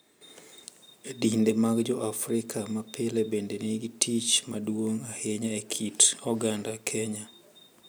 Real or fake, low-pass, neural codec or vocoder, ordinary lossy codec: real; none; none; none